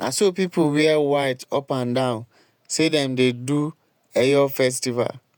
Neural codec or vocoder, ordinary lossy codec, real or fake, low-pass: vocoder, 48 kHz, 128 mel bands, Vocos; none; fake; none